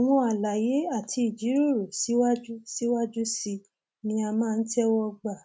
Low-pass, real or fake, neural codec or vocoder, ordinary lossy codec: none; real; none; none